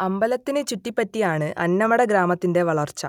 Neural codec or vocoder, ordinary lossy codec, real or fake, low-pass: none; none; real; 19.8 kHz